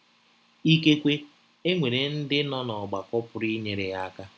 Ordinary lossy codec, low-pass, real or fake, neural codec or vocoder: none; none; real; none